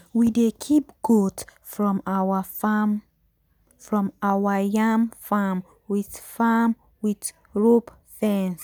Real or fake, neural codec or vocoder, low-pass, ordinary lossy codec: real; none; none; none